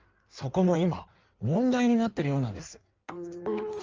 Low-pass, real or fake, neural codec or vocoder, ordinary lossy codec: 7.2 kHz; fake; codec, 16 kHz in and 24 kHz out, 1.1 kbps, FireRedTTS-2 codec; Opus, 24 kbps